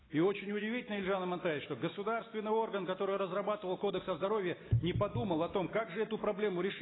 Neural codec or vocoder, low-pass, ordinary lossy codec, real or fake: none; 7.2 kHz; AAC, 16 kbps; real